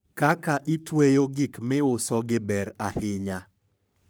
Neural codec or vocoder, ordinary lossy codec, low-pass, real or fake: codec, 44.1 kHz, 3.4 kbps, Pupu-Codec; none; none; fake